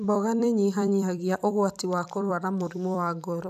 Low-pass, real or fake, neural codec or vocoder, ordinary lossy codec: 14.4 kHz; fake; vocoder, 44.1 kHz, 128 mel bands every 256 samples, BigVGAN v2; none